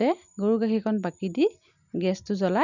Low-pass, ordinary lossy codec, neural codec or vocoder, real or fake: 7.2 kHz; none; none; real